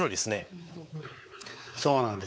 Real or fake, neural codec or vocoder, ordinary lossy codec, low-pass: fake; codec, 16 kHz, 4 kbps, X-Codec, WavLM features, trained on Multilingual LibriSpeech; none; none